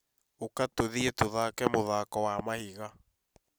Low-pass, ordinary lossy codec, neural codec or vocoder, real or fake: none; none; none; real